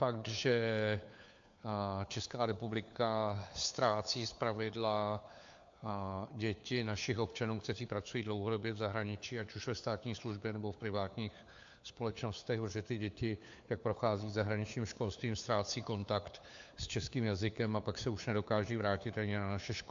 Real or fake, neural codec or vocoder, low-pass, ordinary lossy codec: fake; codec, 16 kHz, 4 kbps, FunCodec, trained on LibriTTS, 50 frames a second; 7.2 kHz; AAC, 64 kbps